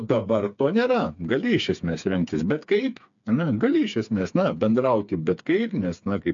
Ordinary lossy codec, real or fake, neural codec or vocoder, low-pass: MP3, 64 kbps; fake; codec, 16 kHz, 4 kbps, FreqCodec, smaller model; 7.2 kHz